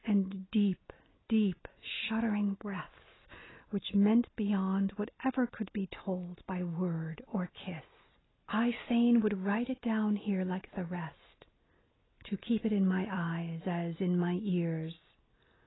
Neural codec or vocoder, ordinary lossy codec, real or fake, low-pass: none; AAC, 16 kbps; real; 7.2 kHz